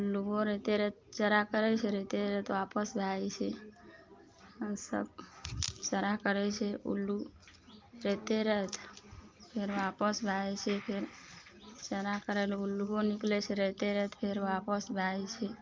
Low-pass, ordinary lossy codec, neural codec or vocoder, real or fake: 7.2 kHz; Opus, 24 kbps; none; real